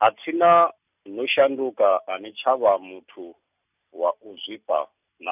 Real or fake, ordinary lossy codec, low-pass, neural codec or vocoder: real; none; 3.6 kHz; none